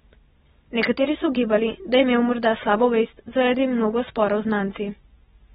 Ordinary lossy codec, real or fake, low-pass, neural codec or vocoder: AAC, 16 kbps; real; 19.8 kHz; none